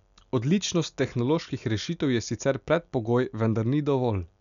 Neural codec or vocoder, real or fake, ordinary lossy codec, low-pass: none; real; none; 7.2 kHz